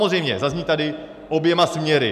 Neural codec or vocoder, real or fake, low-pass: none; real; 14.4 kHz